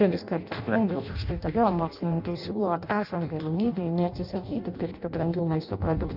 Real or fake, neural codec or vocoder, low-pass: fake; codec, 16 kHz in and 24 kHz out, 0.6 kbps, FireRedTTS-2 codec; 5.4 kHz